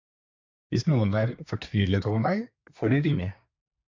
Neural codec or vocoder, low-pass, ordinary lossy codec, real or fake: codec, 24 kHz, 1 kbps, SNAC; 7.2 kHz; AAC, 48 kbps; fake